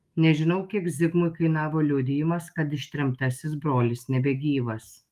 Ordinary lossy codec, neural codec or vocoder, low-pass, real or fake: Opus, 32 kbps; autoencoder, 48 kHz, 128 numbers a frame, DAC-VAE, trained on Japanese speech; 14.4 kHz; fake